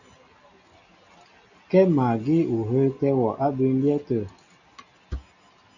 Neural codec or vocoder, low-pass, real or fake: none; 7.2 kHz; real